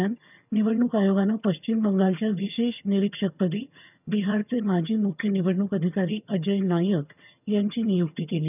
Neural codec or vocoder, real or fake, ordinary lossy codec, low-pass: vocoder, 22.05 kHz, 80 mel bands, HiFi-GAN; fake; none; 3.6 kHz